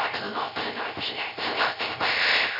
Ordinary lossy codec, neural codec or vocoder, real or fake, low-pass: none; codec, 16 kHz, 0.3 kbps, FocalCodec; fake; 5.4 kHz